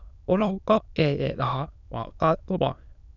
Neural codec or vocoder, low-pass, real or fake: autoencoder, 22.05 kHz, a latent of 192 numbers a frame, VITS, trained on many speakers; 7.2 kHz; fake